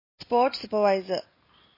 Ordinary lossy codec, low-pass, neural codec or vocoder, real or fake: MP3, 24 kbps; 5.4 kHz; none; real